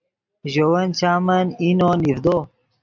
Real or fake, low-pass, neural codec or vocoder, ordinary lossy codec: real; 7.2 kHz; none; MP3, 64 kbps